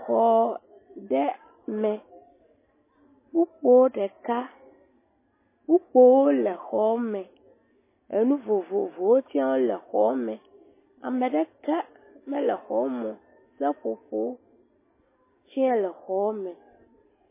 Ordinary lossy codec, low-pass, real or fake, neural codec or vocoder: MP3, 16 kbps; 3.6 kHz; real; none